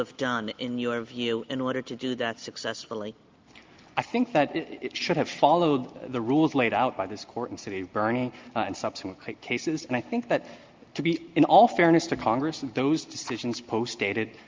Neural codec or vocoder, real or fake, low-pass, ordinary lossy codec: none; real; 7.2 kHz; Opus, 32 kbps